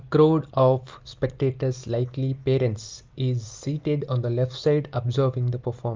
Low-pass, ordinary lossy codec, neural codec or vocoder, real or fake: 7.2 kHz; Opus, 24 kbps; none; real